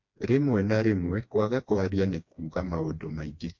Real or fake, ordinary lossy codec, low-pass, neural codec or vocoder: fake; MP3, 48 kbps; 7.2 kHz; codec, 16 kHz, 2 kbps, FreqCodec, smaller model